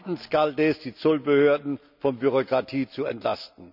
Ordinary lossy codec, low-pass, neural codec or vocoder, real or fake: none; 5.4 kHz; none; real